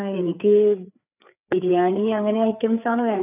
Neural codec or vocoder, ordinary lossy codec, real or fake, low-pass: codec, 16 kHz, 4 kbps, FreqCodec, larger model; none; fake; 3.6 kHz